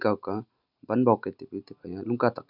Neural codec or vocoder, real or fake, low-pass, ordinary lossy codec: none; real; 5.4 kHz; none